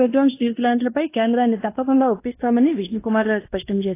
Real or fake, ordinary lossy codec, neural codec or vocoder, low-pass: fake; AAC, 24 kbps; codec, 16 kHz in and 24 kHz out, 0.9 kbps, LongCat-Audio-Codec, fine tuned four codebook decoder; 3.6 kHz